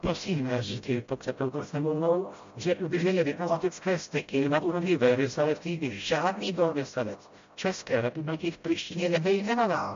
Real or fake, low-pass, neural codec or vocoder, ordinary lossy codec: fake; 7.2 kHz; codec, 16 kHz, 0.5 kbps, FreqCodec, smaller model; MP3, 48 kbps